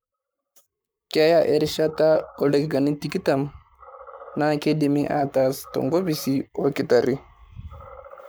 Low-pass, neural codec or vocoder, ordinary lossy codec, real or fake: none; codec, 44.1 kHz, 7.8 kbps, Pupu-Codec; none; fake